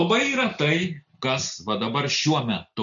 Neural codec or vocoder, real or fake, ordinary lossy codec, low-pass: none; real; MP3, 96 kbps; 7.2 kHz